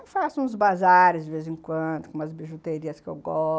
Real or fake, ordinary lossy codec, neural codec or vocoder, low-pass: real; none; none; none